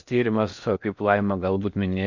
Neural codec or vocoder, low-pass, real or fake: codec, 16 kHz in and 24 kHz out, 0.8 kbps, FocalCodec, streaming, 65536 codes; 7.2 kHz; fake